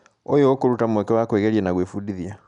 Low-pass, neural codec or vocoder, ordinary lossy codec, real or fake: 10.8 kHz; none; none; real